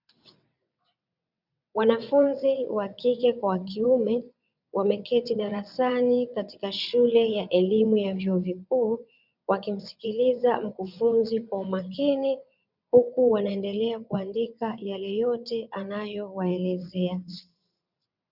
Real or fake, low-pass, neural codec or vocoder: fake; 5.4 kHz; vocoder, 22.05 kHz, 80 mel bands, WaveNeXt